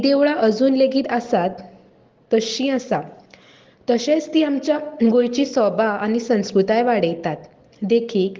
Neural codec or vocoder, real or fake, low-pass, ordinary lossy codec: none; real; 7.2 kHz; Opus, 16 kbps